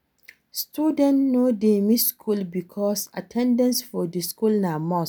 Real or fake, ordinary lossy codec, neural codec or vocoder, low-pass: real; none; none; none